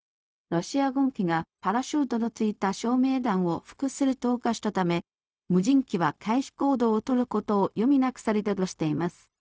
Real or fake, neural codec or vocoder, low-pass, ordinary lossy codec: fake; codec, 16 kHz, 0.4 kbps, LongCat-Audio-Codec; none; none